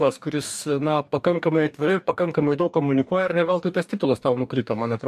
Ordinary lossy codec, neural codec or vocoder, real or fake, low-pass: AAC, 96 kbps; codec, 44.1 kHz, 2.6 kbps, DAC; fake; 14.4 kHz